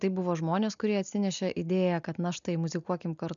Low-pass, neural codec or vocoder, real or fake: 7.2 kHz; none; real